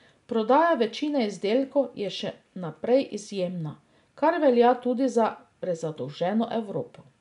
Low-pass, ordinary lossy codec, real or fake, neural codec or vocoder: 10.8 kHz; none; real; none